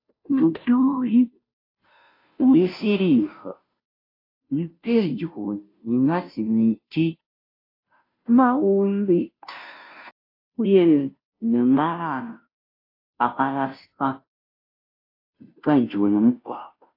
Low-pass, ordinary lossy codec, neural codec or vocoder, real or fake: 5.4 kHz; AAC, 32 kbps; codec, 16 kHz, 0.5 kbps, FunCodec, trained on Chinese and English, 25 frames a second; fake